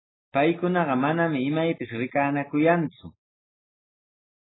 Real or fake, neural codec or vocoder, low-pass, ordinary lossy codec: real; none; 7.2 kHz; AAC, 16 kbps